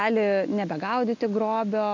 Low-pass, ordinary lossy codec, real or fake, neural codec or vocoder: 7.2 kHz; MP3, 64 kbps; real; none